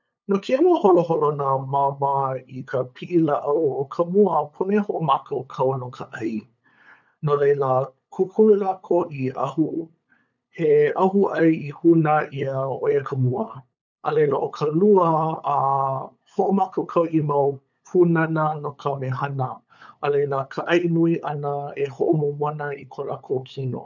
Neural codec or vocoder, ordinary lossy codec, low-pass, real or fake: codec, 16 kHz, 8 kbps, FunCodec, trained on LibriTTS, 25 frames a second; none; 7.2 kHz; fake